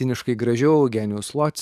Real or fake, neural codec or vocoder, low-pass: fake; codec, 44.1 kHz, 7.8 kbps, DAC; 14.4 kHz